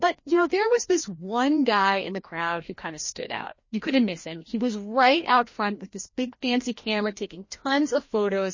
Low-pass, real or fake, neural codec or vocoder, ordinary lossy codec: 7.2 kHz; fake; codec, 16 kHz, 1 kbps, FreqCodec, larger model; MP3, 32 kbps